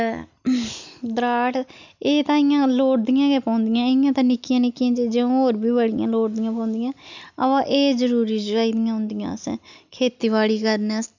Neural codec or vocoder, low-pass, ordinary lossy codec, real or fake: none; 7.2 kHz; none; real